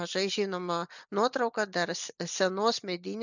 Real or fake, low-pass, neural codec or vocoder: real; 7.2 kHz; none